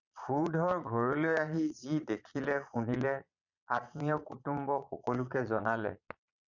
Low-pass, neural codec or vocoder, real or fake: 7.2 kHz; vocoder, 22.05 kHz, 80 mel bands, Vocos; fake